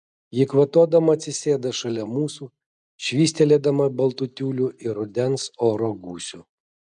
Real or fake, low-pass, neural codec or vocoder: real; 10.8 kHz; none